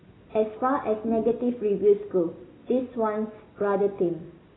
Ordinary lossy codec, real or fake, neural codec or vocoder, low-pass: AAC, 16 kbps; real; none; 7.2 kHz